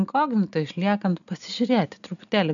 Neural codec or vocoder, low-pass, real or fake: none; 7.2 kHz; real